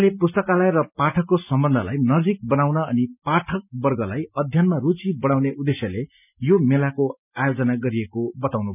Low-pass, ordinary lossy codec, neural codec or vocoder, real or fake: 3.6 kHz; none; none; real